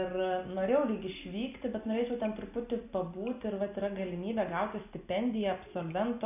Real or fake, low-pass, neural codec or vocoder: real; 3.6 kHz; none